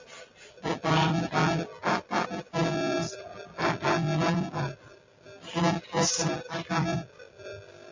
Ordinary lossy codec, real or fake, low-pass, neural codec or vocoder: MP3, 64 kbps; real; 7.2 kHz; none